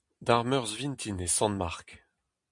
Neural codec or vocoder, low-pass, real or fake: none; 10.8 kHz; real